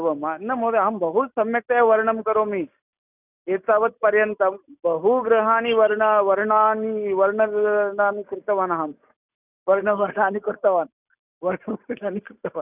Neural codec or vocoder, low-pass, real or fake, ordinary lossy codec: none; 3.6 kHz; real; none